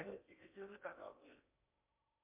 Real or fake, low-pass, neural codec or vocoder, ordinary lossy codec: fake; 3.6 kHz; codec, 16 kHz in and 24 kHz out, 0.6 kbps, FocalCodec, streaming, 4096 codes; MP3, 32 kbps